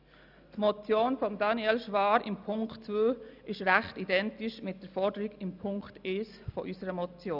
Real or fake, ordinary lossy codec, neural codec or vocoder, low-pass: real; none; none; 5.4 kHz